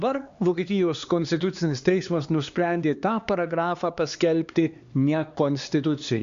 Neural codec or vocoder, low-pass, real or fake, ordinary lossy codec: codec, 16 kHz, 2 kbps, X-Codec, HuBERT features, trained on LibriSpeech; 7.2 kHz; fake; Opus, 64 kbps